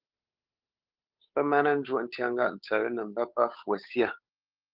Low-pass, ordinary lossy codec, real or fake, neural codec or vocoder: 5.4 kHz; Opus, 32 kbps; fake; codec, 16 kHz, 8 kbps, FunCodec, trained on Chinese and English, 25 frames a second